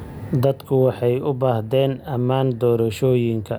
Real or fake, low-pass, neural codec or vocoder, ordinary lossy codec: real; none; none; none